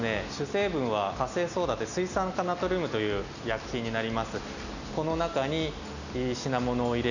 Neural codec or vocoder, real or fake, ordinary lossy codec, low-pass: none; real; none; 7.2 kHz